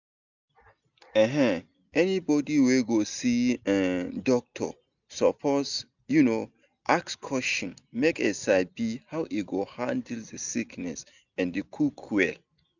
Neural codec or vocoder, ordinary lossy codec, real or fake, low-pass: none; none; real; 7.2 kHz